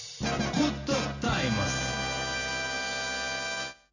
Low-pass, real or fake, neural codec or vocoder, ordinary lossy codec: 7.2 kHz; real; none; none